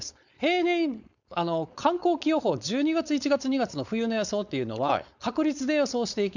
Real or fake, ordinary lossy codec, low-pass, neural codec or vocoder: fake; none; 7.2 kHz; codec, 16 kHz, 4.8 kbps, FACodec